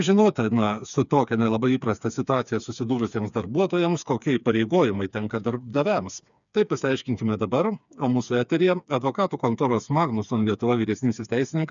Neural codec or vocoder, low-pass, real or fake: codec, 16 kHz, 4 kbps, FreqCodec, smaller model; 7.2 kHz; fake